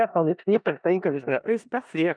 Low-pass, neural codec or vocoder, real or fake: 9.9 kHz; codec, 16 kHz in and 24 kHz out, 0.4 kbps, LongCat-Audio-Codec, four codebook decoder; fake